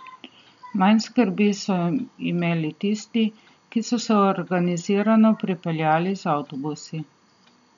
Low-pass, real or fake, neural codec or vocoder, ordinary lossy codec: 7.2 kHz; real; none; none